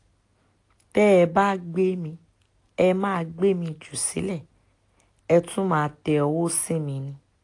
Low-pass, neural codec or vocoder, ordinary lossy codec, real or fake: 10.8 kHz; none; AAC, 48 kbps; real